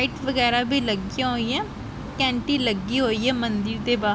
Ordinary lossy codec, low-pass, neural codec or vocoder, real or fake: none; none; none; real